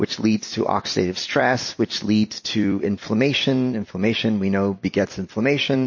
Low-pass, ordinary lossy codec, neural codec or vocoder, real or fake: 7.2 kHz; MP3, 32 kbps; vocoder, 44.1 kHz, 128 mel bands every 256 samples, BigVGAN v2; fake